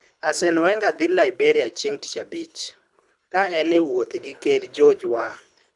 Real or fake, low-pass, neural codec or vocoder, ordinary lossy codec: fake; 10.8 kHz; codec, 24 kHz, 3 kbps, HILCodec; none